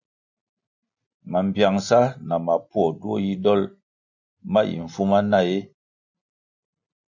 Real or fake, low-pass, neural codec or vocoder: real; 7.2 kHz; none